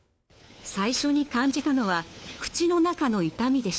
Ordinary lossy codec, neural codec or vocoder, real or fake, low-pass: none; codec, 16 kHz, 4 kbps, FunCodec, trained on LibriTTS, 50 frames a second; fake; none